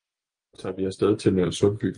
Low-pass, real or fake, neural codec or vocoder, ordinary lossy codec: 9.9 kHz; real; none; Opus, 32 kbps